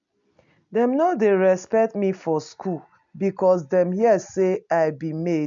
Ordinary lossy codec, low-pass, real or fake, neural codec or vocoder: MP3, 64 kbps; 7.2 kHz; real; none